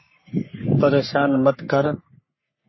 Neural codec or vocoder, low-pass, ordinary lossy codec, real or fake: codec, 44.1 kHz, 3.4 kbps, Pupu-Codec; 7.2 kHz; MP3, 24 kbps; fake